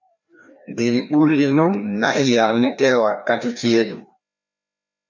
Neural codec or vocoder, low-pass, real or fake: codec, 16 kHz, 1 kbps, FreqCodec, larger model; 7.2 kHz; fake